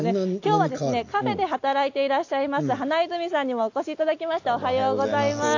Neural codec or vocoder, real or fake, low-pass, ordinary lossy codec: none; real; 7.2 kHz; AAC, 48 kbps